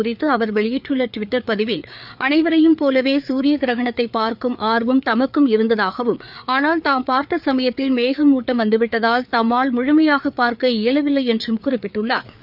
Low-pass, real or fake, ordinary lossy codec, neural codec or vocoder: 5.4 kHz; fake; none; codec, 16 kHz, 4 kbps, FreqCodec, larger model